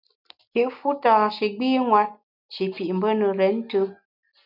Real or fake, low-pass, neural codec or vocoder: real; 5.4 kHz; none